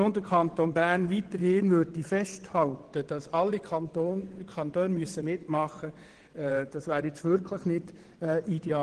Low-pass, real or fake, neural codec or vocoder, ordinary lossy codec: 9.9 kHz; fake; vocoder, 22.05 kHz, 80 mel bands, WaveNeXt; Opus, 16 kbps